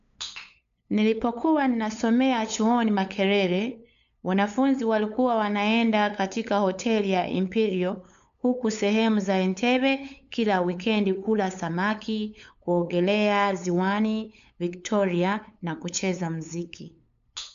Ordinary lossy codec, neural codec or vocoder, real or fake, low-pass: MP3, 64 kbps; codec, 16 kHz, 8 kbps, FunCodec, trained on LibriTTS, 25 frames a second; fake; 7.2 kHz